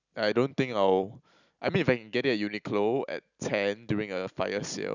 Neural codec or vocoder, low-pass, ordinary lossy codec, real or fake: none; 7.2 kHz; none; real